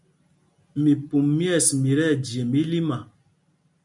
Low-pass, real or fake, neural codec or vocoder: 10.8 kHz; real; none